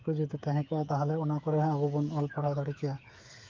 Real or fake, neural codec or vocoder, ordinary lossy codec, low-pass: fake; codec, 16 kHz, 8 kbps, FreqCodec, smaller model; Opus, 32 kbps; 7.2 kHz